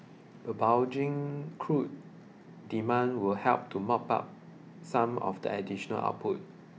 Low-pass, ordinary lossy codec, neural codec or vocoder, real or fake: none; none; none; real